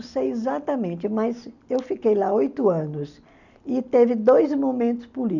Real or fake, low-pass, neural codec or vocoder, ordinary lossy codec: real; 7.2 kHz; none; none